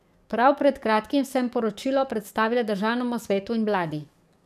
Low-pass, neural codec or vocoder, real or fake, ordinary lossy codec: 14.4 kHz; codec, 44.1 kHz, 7.8 kbps, DAC; fake; none